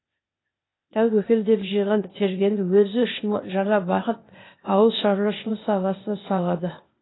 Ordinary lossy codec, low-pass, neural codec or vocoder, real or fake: AAC, 16 kbps; 7.2 kHz; codec, 16 kHz, 0.8 kbps, ZipCodec; fake